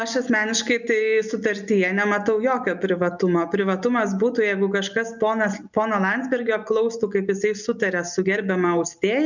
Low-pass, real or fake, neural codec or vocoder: 7.2 kHz; real; none